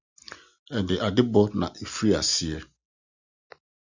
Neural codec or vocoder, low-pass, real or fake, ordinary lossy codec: none; 7.2 kHz; real; Opus, 64 kbps